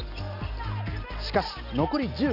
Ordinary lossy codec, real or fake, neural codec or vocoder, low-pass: none; real; none; 5.4 kHz